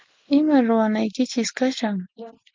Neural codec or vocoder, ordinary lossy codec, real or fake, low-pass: none; Opus, 24 kbps; real; 7.2 kHz